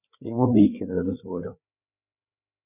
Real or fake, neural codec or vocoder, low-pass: fake; codec, 16 kHz, 4 kbps, FreqCodec, larger model; 3.6 kHz